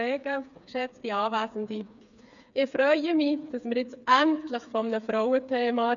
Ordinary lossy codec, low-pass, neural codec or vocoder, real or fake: none; 7.2 kHz; codec, 16 kHz, 4 kbps, FreqCodec, smaller model; fake